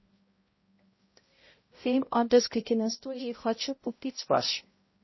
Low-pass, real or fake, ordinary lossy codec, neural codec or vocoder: 7.2 kHz; fake; MP3, 24 kbps; codec, 16 kHz, 0.5 kbps, X-Codec, HuBERT features, trained on balanced general audio